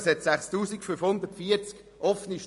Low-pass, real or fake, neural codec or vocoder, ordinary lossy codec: 14.4 kHz; fake; vocoder, 44.1 kHz, 128 mel bands every 256 samples, BigVGAN v2; MP3, 48 kbps